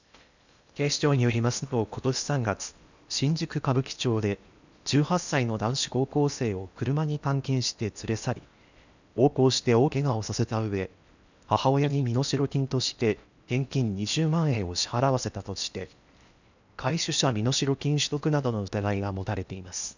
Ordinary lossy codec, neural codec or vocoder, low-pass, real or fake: none; codec, 16 kHz in and 24 kHz out, 0.8 kbps, FocalCodec, streaming, 65536 codes; 7.2 kHz; fake